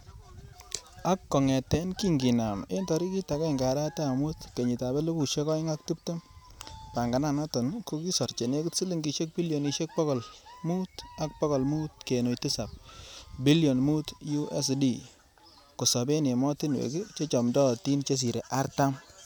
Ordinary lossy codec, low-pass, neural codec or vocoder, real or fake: none; none; none; real